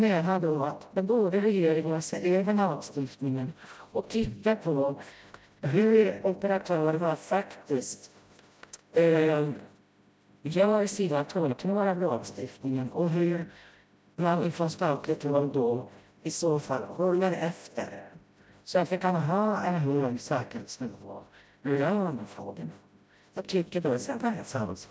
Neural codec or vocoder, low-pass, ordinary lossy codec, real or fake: codec, 16 kHz, 0.5 kbps, FreqCodec, smaller model; none; none; fake